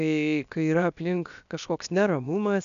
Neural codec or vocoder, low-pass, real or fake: codec, 16 kHz, 0.7 kbps, FocalCodec; 7.2 kHz; fake